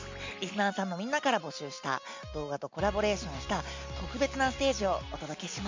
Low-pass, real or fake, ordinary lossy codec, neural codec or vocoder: 7.2 kHz; real; MP3, 64 kbps; none